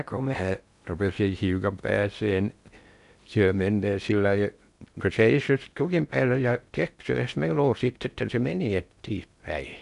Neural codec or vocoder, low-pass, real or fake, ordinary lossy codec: codec, 16 kHz in and 24 kHz out, 0.6 kbps, FocalCodec, streaming, 2048 codes; 10.8 kHz; fake; none